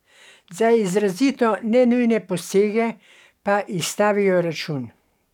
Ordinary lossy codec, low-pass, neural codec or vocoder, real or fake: none; 19.8 kHz; codec, 44.1 kHz, 7.8 kbps, DAC; fake